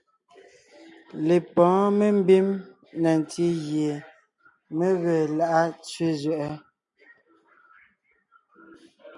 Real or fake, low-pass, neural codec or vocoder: real; 10.8 kHz; none